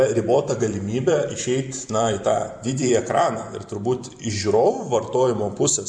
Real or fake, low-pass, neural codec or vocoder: fake; 9.9 kHz; vocoder, 44.1 kHz, 128 mel bands every 512 samples, BigVGAN v2